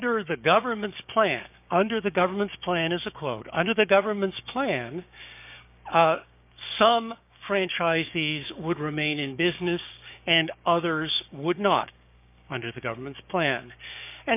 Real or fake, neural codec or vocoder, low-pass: fake; codec, 16 kHz, 6 kbps, DAC; 3.6 kHz